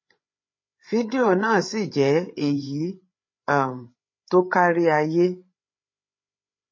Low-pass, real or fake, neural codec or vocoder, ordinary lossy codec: 7.2 kHz; fake; codec, 16 kHz, 8 kbps, FreqCodec, larger model; MP3, 32 kbps